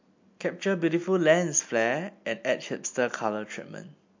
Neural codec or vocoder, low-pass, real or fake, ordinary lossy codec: none; 7.2 kHz; real; MP3, 48 kbps